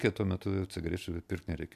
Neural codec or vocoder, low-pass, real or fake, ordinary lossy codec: none; 14.4 kHz; real; MP3, 96 kbps